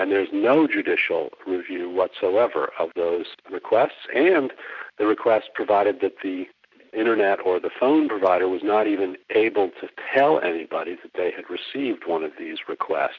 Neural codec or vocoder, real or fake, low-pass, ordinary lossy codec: none; real; 7.2 kHz; MP3, 64 kbps